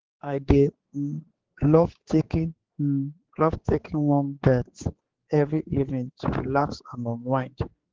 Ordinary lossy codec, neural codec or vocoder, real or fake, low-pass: Opus, 16 kbps; codec, 16 kHz, 4 kbps, X-Codec, HuBERT features, trained on general audio; fake; 7.2 kHz